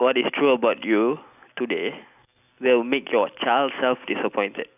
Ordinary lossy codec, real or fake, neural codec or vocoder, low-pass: none; real; none; 3.6 kHz